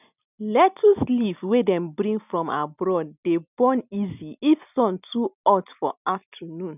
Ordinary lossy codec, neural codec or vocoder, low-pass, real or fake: none; none; 3.6 kHz; real